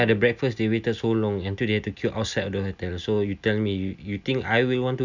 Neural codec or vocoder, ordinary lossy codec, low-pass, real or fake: none; none; 7.2 kHz; real